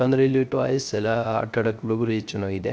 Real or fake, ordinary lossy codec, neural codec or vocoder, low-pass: fake; none; codec, 16 kHz, 0.3 kbps, FocalCodec; none